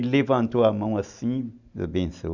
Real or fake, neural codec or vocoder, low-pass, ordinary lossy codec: real; none; 7.2 kHz; none